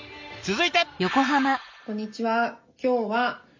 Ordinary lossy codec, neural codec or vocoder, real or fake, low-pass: MP3, 64 kbps; none; real; 7.2 kHz